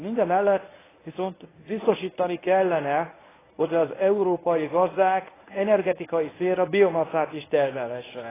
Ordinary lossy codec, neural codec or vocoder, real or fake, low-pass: AAC, 16 kbps; codec, 24 kHz, 0.9 kbps, WavTokenizer, medium speech release version 1; fake; 3.6 kHz